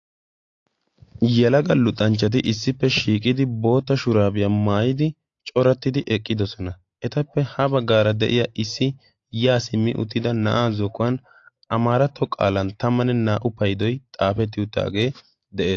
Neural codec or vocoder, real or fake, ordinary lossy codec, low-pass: none; real; AAC, 48 kbps; 7.2 kHz